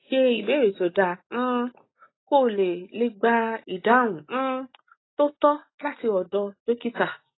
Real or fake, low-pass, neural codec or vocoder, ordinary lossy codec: real; 7.2 kHz; none; AAC, 16 kbps